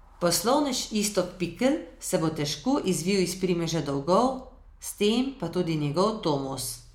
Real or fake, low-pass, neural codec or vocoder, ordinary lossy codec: real; 19.8 kHz; none; MP3, 96 kbps